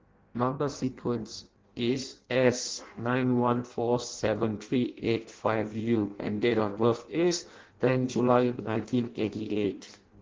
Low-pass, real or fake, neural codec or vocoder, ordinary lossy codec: 7.2 kHz; fake; codec, 16 kHz in and 24 kHz out, 0.6 kbps, FireRedTTS-2 codec; Opus, 16 kbps